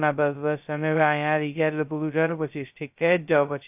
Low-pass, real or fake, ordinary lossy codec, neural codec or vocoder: 3.6 kHz; fake; AAC, 32 kbps; codec, 16 kHz, 0.2 kbps, FocalCodec